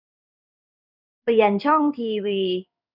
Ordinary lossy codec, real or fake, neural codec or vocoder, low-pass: none; fake; codec, 16 kHz in and 24 kHz out, 1 kbps, XY-Tokenizer; 5.4 kHz